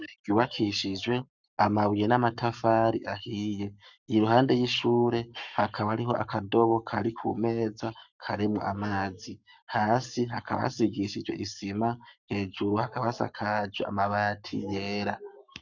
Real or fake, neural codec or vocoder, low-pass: fake; codec, 44.1 kHz, 7.8 kbps, Pupu-Codec; 7.2 kHz